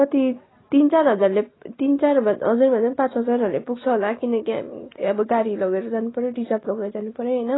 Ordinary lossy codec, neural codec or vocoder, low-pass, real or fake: AAC, 16 kbps; none; 7.2 kHz; real